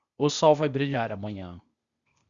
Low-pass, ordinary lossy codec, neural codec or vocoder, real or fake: 7.2 kHz; Opus, 64 kbps; codec, 16 kHz, 0.8 kbps, ZipCodec; fake